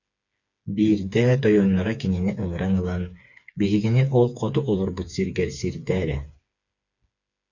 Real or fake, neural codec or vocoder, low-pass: fake; codec, 16 kHz, 4 kbps, FreqCodec, smaller model; 7.2 kHz